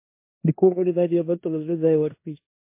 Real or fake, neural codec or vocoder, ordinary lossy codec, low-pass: fake; codec, 16 kHz in and 24 kHz out, 0.9 kbps, LongCat-Audio-Codec, four codebook decoder; MP3, 24 kbps; 3.6 kHz